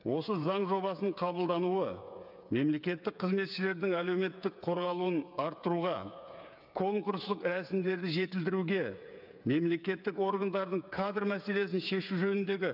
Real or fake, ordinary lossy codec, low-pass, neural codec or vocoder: fake; none; 5.4 kHz; codec, 16 kHz, 8 kbps, FreqCodec, smaller model